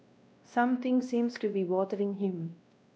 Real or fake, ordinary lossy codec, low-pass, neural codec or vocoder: fake; none; none; codec, 16 kHz, 1 kbps, X-Codec, WavLM features, trained on Multilingual LibriSpeech